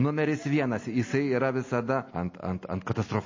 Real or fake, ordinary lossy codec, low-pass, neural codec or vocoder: real; MP3, 32 kbps; 7.2 kHz; none